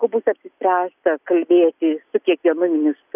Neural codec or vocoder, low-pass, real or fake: none; 3.6 kHz; real